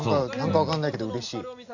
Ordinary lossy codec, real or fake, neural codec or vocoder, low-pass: none; real; none; 7.2 kHz